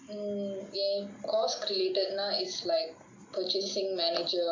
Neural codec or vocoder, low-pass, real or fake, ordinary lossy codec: none; 7.2 kHz; real; MP3, 64 kbps